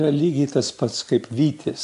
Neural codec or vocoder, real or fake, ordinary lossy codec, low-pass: none; real; AAC, 64 kbps; 10.8 kHz